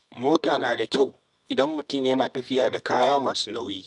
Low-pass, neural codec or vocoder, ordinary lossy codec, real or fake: 10.8 kHz; codec, 24 kHz, 0.9 kbps, WavTokenizer, medium music audio release; none; fake